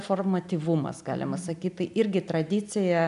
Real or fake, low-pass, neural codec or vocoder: real; 10.8 kHz; none